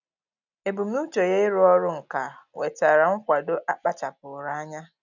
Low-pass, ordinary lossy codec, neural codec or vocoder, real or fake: 7.2 kHz; none; none; real